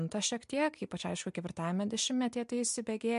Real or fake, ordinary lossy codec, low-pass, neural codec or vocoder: real; MP3, 64 kbps; 10.8 kHz; none